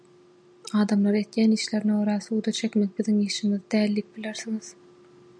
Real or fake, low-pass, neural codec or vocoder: real; 9.9 kHz; none